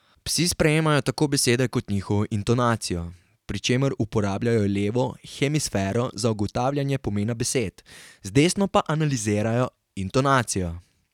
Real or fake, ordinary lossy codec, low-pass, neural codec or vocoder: real; none; 19.8 kHz; none